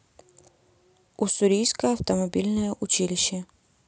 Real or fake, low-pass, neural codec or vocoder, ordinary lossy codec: real; none; none; none